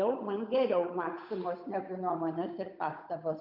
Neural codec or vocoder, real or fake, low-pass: codec, 16 kHz, 8 kbps, FunCodec, trained on Chinese and English, 25 frames a second; fake; 5.4 kHz